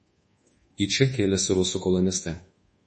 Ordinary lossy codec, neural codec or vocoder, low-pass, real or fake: MP3, 32 kbps; codec, 24 kHz, 1.2 kbps, DualCodec; 10.8 kHz; fake